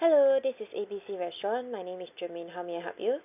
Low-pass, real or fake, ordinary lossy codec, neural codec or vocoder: 3.6 kHz; real; none; none